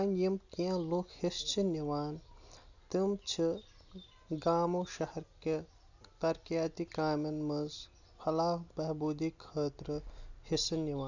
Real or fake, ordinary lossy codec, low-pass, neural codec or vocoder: real; none; 7.2 kHz; none